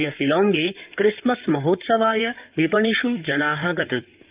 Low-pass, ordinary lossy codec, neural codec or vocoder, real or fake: 3.6 kHz; Opus, 24 kbps; codec, 16 kHz in and 24 kHz out, 2.2 kbps, FireRedTTS-2 codec; fake